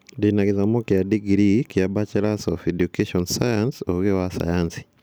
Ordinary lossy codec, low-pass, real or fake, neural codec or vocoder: none; none; real; none